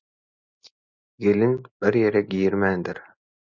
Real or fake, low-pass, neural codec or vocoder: real; 7.2 kHz; none